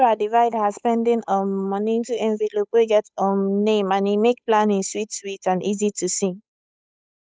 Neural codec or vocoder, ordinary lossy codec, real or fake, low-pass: codec, 16 kHz, 8 kbps, FunCodec, trained on Chinese and English, 25 frames a second; none; fake; none